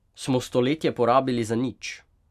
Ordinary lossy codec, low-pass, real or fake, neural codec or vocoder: none; 14.4 kHz; real; none